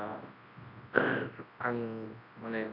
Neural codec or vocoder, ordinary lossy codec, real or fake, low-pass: codec, 24 kHz, 0.9 kbps, WavTokenizer, large speech release; Opus, 64 kbps; fake; 5.4 kHz